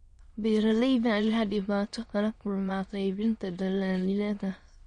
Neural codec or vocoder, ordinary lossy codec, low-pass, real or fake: autoencoder, 22.05 kHz, a latent of 192 numbers a frame, VITS, trained on many speakers; MP3, 48 kbps; 9.9 kHz; fake